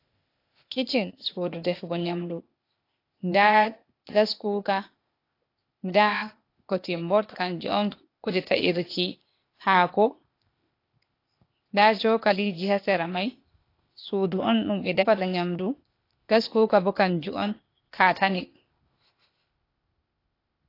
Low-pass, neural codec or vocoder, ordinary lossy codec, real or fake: 5.4 kHz; codec, 16 kHz, 0.8 kbps, ZipCodec; AAC, 32 kbps; fake